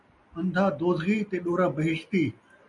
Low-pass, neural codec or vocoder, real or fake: 10.8 kHz; none; real